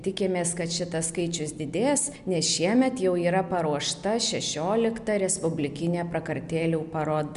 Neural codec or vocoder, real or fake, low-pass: none; real; 10.8 kHz